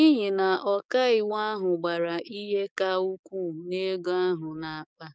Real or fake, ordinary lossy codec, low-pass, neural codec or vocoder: fake; none; none; codec, 16 kHz, 6 kbps, DAC